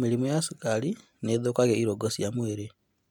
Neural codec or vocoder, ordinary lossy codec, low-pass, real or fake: none; MP3, 96 kbps; 19.8 kHz; real